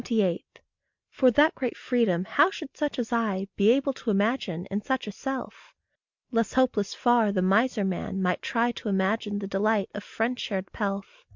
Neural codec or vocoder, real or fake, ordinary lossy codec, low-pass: none; real; MP3, 64 kbps; 7.2 kHz